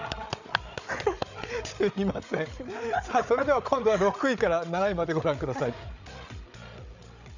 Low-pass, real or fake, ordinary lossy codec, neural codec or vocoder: 7.2 kHz; fake; none; codec, 16 kHz, 8 kbps, FreqCodec, larger model